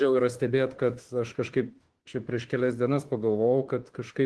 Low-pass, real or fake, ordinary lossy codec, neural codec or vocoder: 10.8 kHz; fake; Opus, 16 kbps; autoencoder, 48 kHz, 32 numbers a frame, DAC-VAE, trained on Japanese speech